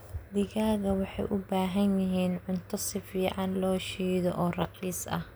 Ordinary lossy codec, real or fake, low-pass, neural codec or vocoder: none; real; none; none